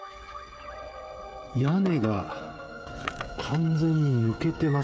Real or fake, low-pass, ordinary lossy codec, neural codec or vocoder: fake; none; none; codec, 16 kHz, 16 kbps, FreqCodec, smaller model